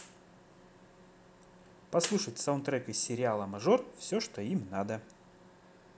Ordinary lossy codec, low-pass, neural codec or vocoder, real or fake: none; none; none; real